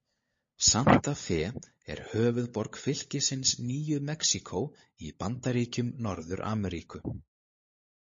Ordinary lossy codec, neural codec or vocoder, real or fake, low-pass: MP3, 32 kbps; codec, 16 kHz, 16 kbps, FunCodec, trained on LibriTTS, 50 frames a second; fake; 7.2 kHz